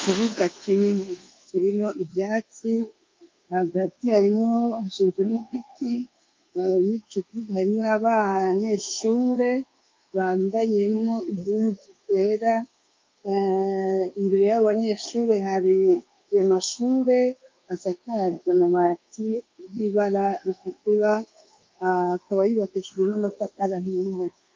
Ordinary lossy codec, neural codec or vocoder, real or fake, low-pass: Opus, 32 kbps; codec, 24 kHz, 1.2 kbps, DualCodec; fake; 7.2 kHz